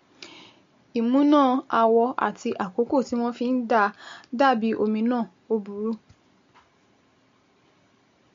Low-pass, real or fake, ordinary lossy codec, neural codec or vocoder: 7.2 kHz; real; MP3, 48 kbps; none